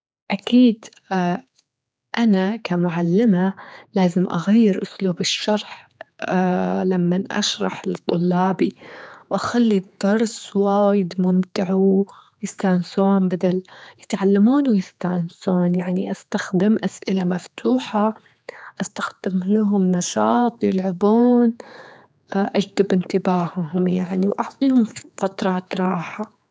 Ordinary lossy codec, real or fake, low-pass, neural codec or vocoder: none; fake; none; codec, 16 kHz, 4 kbps, X-Codec, HuBERT features, trained on general audio